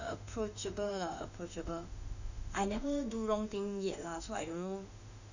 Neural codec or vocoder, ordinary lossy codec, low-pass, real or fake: autoencoder, 48 kHz, 32 numbers a frame, DAC-VAE, trained on Japanese speech; none; 7.2 kHz; fake